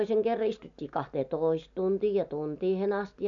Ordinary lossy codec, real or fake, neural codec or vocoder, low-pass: none; real; none; 7.2 kHz